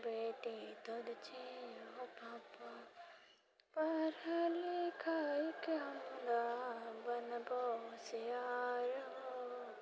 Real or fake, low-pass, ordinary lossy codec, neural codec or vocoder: real; none; none; none